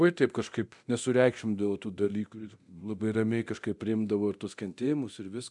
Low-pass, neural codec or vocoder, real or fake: 10.8 kHz; codec, 24 kHz, 0.9 kbps, DualCodec; fake